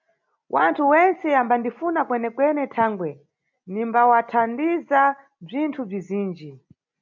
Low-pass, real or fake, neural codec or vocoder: 7.2 kHz; real; none